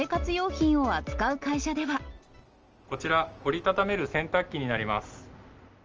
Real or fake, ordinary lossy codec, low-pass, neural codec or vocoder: real; Opus, 24 kbps; 7.2 kHz; none